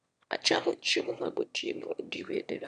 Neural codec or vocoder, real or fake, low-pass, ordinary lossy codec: autoencoder, 22.05 kHz, a latent of 192 numbers a frame, VITS, trained on one speaker; fake; 9.9 kHz; none